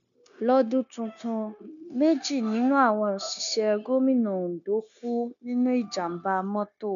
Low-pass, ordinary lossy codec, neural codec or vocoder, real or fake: 7.2 kHz; none; codec, 16 kHz, 0.9 kbps, LongCat-Audio-Codec; fake